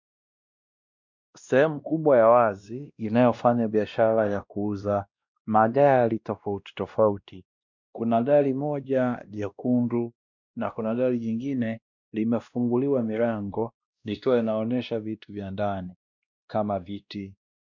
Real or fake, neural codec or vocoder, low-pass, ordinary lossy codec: fake; codec, 16 kHz, 1 kbps, X-Codec, WavLM features, trained on Multilingual LibriSpeech; 7.2 kHz; MP3, 64 kbps